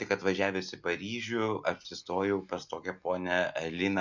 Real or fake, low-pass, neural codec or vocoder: real; 7.2 kHz; none